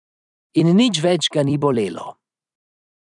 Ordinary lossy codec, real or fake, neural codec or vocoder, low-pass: none; fake; vocoder, 44.1 kHz, 128 mel bands every 256 samples, BigVGAN v2; 10.8 kHz